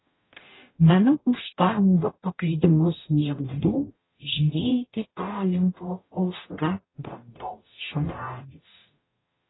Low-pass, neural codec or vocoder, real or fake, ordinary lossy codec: 7.2 kHz; codec, 44.1 kHz, 0.9 kbps, DAC; fake; AAC, 16 kbps